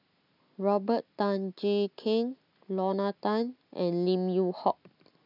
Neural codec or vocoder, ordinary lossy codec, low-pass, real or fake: none; none; 5.4 kHz; real